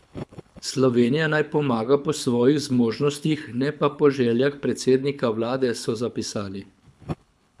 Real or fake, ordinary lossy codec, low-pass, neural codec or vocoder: fake; none; none; codec, 24 kHz, 6 kbps, HILCodec